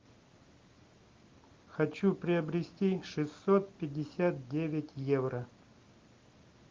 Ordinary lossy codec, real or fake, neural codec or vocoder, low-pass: Opus, 32 kbps; real; none; 7.2 kHz